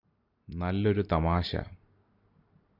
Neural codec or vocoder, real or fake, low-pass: none; real; 5.4 kHz